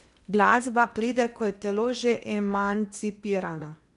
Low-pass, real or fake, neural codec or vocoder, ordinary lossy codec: 10.8 kHz; fake; codec, 16 kHz in and 24 kHz out, 0.8 kbps, FocalCodec, streaming, 65536 codes; none